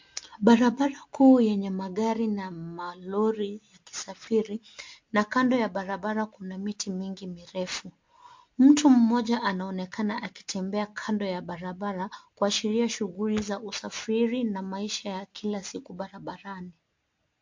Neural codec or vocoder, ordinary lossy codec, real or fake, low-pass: none; MP3, 48 kbps; real; 7.2 kHz